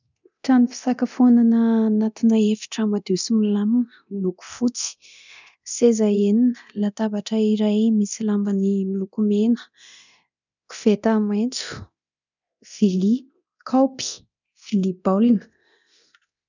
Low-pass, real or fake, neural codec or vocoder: 7.2 kHz; fake; codec, 24 kHz, 0.9 kbps, DualCodec